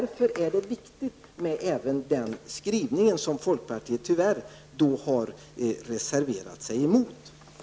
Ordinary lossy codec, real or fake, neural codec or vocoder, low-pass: none; real; none; none